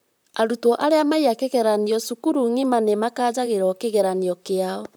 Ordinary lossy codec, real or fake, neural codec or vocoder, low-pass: none; fake; vocoder, 44.1 kHz, 128 mel bands, Pupu-Vocoder; none